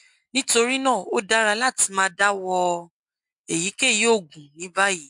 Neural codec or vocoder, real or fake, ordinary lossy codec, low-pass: none; real; MP3, 64 kbps; 10.8 kHz